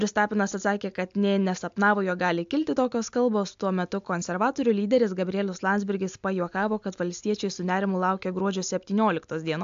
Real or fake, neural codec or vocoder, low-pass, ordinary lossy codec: real; none; 7.2 kHz; AAC, 64 kbps